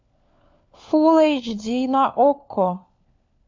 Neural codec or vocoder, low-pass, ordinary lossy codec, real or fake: codec, 16 kHz, 4 kbps, FunCodec, trained on LibriTTS, 50 frames a second; 7.2 kHz; MP3, 48 kbps; fake